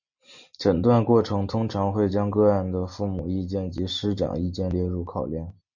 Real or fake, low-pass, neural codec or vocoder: real; 7.2 kHz; none